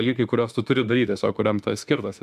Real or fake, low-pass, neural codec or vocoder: fake; 14.4 kHz; autoencoder, 48 kHz, 32 numbers a frame, DAC-VAE, trained on Japanese speech